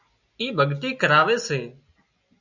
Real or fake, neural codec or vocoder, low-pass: real; none; 7.2 kHz